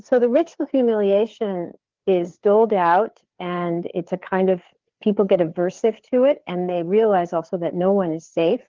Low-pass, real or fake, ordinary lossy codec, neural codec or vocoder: 7.2 kHz; fake; Opus, 16 kbps; codec, 16 kHz, 4 kbps, FreqCodec, larger model